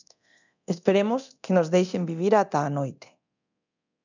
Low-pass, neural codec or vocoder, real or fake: 7.2 kHz; codec, 24 kHz, 0.9 kbps, DualCodec; fake